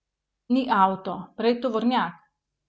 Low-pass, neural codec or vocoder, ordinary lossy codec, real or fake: none; none; none; real